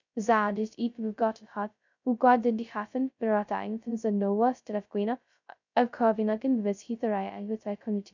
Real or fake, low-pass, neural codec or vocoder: fake; 7.2 kHz; codec, 16 kHz, 0.2 kbps, FocalCodec